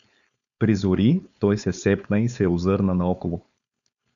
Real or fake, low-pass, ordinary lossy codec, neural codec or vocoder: fake; 7.2 kHz; MP3, 96 kbps; codec, 16 kHz, 4.8 kbps, FACodec